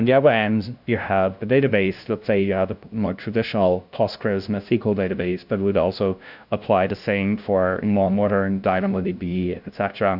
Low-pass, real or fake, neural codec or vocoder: 5.4 kHz; fake; codec, 16 kHz, 0.5 kbps, FunCodec, trained on LibriTTS, 25 frames a second